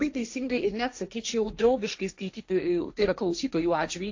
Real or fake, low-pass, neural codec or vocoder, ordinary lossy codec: fake; 7.2 kHz; codec, 16 kHz, 1.1 kbps, Voila-Tokenizer; AAC, 48 kbps